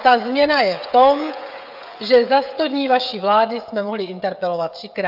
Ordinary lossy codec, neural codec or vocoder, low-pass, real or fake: AAC, 48 kbps; codec, 16 kHz, 16 kbps, FreqCodec, smaller model; 5.4 kHz; fake